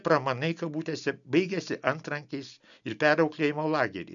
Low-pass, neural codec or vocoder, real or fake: 7.2 kHz; none; real